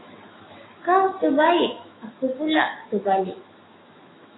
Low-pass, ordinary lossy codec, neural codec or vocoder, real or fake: 7.2 kHz; AAC, 16 kbps; autoencoder, 48 kHz, 128 numbers a frame, DAC-VAE, trained on Japanese speech; fake